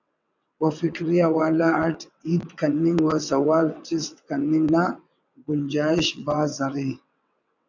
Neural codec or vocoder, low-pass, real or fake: vocoder, 22.05 kHz, 80 mel bands, WaveNeXt; 7.2 kHz; fake